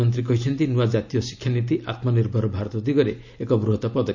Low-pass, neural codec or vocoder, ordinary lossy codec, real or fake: 7.2 kHz; none; none; real